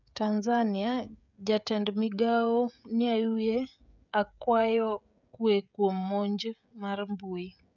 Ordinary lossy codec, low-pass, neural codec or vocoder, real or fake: none; 7.2 kHz; codec, 16 kHz, 16 kbps, FreqCodec, smaller model; fake